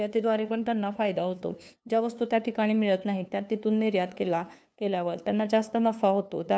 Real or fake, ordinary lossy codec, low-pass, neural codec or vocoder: fake; none; none; codec, 16 kHz, 2 kbps, FunCodec, trained on LibriTTS, 25 frames a second